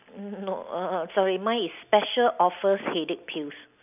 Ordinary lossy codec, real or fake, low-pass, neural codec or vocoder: none; real; 3.6 kHz; none